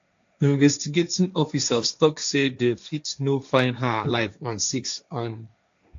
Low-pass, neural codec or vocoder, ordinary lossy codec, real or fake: 7.2 kHz; codec, 16 kHz, 1.1 kbps, Voila-Tokenizer; AAC, 64 kbps; fake